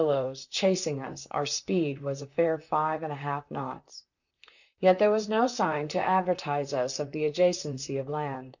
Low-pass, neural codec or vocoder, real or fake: 7.2 kHz; vocoder, 44.1 kHz, 128 mel bands, Pupu-Vocoder; fake